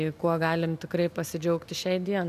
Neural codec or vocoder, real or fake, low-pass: none; real; 14.4 kHz